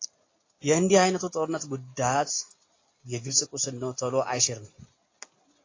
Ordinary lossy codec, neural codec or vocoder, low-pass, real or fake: AAC, 32 kbps; none; 7.2 kHz; real